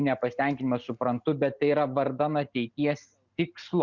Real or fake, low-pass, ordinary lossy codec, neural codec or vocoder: real; 7.2 kHz; Opus, 64 kbps; none